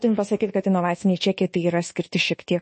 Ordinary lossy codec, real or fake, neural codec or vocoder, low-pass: MP3, 32 kbps; fake; codec, 24 kHz, 1.2 kbps, DualCodec; 10.8 kHz